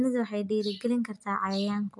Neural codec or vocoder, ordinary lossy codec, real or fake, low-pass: none; MP3, 64 kbps; real; 10.8 kHz